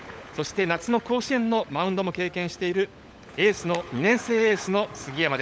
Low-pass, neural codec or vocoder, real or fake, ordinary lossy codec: none; codec, 16 kHz, 16 kbps, FunCodec, trained on LibriTTS, 50 frames a second; fake; none